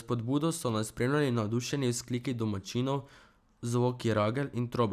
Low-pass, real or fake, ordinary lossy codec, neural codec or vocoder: 14.4 kHz; real; none; none